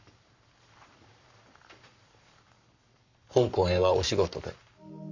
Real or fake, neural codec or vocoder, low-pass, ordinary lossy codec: fake; codec, 44.1 kHz, 7.8 kbps, Pupu-Codec; 7.2 kHz; none